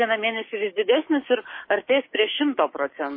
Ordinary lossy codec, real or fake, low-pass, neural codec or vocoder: MP3, 24 kbps; fake; 5.4 kHz; codec, 16 kHz, 6 kbps, DAC